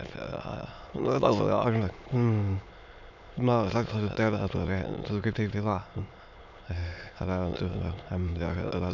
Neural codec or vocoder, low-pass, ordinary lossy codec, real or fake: autoencoder, 22.05 kHz, a latent of 192 numbers a frame, VITS, trained on many speakers; 7.2 kHz; none; fake